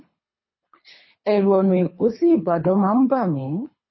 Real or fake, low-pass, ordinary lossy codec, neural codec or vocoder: fake; 7.2 kHz; MP3, 24 kbps; codec, 24 kHz, 3 kbps, HILCodec